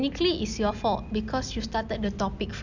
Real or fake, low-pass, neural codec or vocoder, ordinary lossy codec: real; 7.2 kHz; none; none